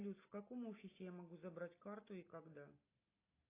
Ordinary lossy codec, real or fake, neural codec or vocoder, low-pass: AAC, 24 kbps; real; none; 3.6 kHz